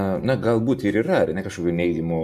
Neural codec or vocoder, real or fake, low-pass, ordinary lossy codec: none; real; 14.4 kHz; AAC, 96 kbps